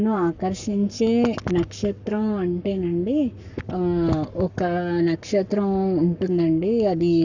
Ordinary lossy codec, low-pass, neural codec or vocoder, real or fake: none; 7.2 kHz; codec, 44.1 kHz, 7.8 kbps, Pupu-Codec; fake